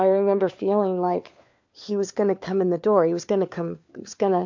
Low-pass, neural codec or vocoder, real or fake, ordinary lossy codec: 7.2 kHz; codec, 16 kHz, 4 kbps, FunCodec, trained on LibriTTS, 50 frames a second; fake; MP3, 48 kbps